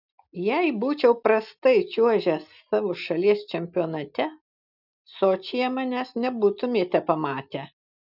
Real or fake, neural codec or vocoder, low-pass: real; none; 5.4 kHz